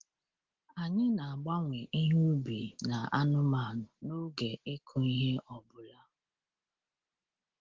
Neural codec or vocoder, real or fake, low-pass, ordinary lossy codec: none; real; 7.2 kHz; Opus, 16 kbps